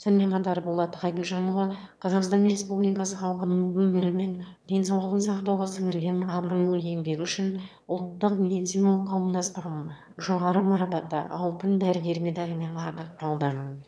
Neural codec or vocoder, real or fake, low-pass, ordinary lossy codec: autoencoder, 22.05 kHz, a latent of 192 numbers a frame, VITS, trained on one speaker; fake; none; none